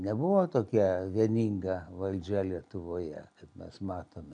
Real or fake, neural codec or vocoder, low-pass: real; none; 9.9 kHz